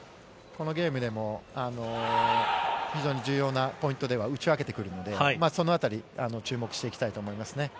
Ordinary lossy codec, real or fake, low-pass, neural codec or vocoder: none; real; none; none